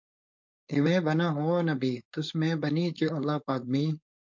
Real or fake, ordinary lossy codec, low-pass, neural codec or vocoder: fake; MP3, 48 kbps; 7.2 kHz; codec, 16 kHz, 4.8 kbps, FACodec